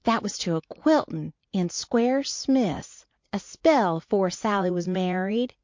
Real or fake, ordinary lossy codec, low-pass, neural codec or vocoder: fake; MP3, 48 kbps; 7.2 kHz; vocoder, 44.1 kHz, 80 mel bands, Vocos